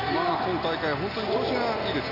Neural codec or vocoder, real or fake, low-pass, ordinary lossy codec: none; real; 5.4 kHz; none